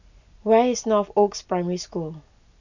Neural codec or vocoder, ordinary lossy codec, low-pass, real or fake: none; none; 7.2 kHz; real